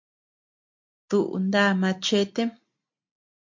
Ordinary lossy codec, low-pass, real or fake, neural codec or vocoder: MP3, 48 kbps; 7.2 kHz; real; none